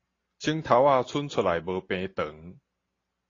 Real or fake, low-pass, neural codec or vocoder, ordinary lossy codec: real; 7.2 kHz; none; AAC, 32 kbps